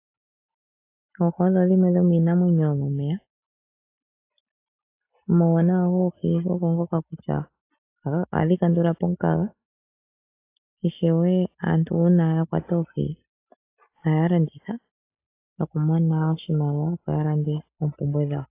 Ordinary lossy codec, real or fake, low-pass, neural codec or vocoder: AAC, 24 kbps; real; 3.6 kHz; none